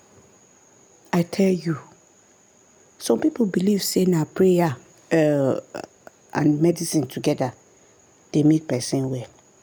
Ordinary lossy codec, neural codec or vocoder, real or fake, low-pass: none; none; real; none